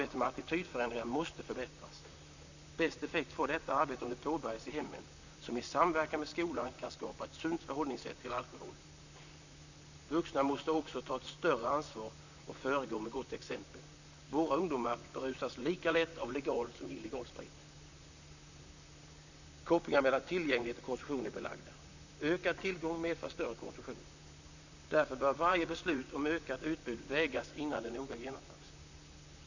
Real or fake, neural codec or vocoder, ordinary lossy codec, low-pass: fake; vocoder, 44.1 kHz, 128 mel bands, Pupu-Vocoder; none; 7.2 kHz